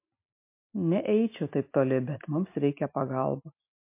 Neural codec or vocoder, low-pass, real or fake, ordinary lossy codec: none; 3.6 kHz; real; MP3, 24 kbps